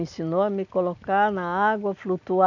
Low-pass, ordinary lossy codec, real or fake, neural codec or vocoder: 7.2 kHz; none; real; none